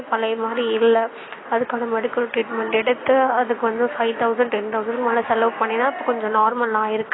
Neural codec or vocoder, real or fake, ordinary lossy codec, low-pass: none; real; AAC, 16 kbps; 7.2 kHz